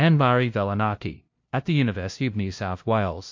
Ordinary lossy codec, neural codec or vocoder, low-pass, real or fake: MP3, 48 kbps; codec, 16 kHz, 0.5 kbps, FunCodec, trained on LibriTTS, 25 frames a second; 7.2 kHz; fake